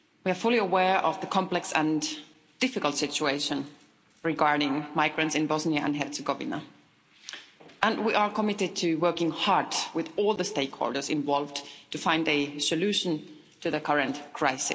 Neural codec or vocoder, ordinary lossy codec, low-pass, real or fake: none; none; none; real